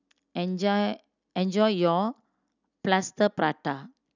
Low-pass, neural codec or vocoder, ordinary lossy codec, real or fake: 7.2 kHz; none; none; real